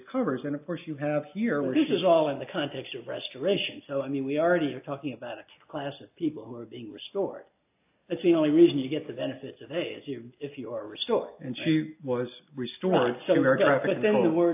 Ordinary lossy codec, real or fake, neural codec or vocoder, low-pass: AAC, 32 kbps; real; none; 3.6 kHz